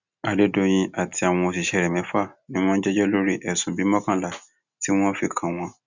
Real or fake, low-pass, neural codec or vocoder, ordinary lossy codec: real; 7.2 kHz; none; none